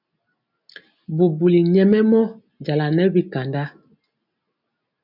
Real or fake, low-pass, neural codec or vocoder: real; 5.4 kHz; none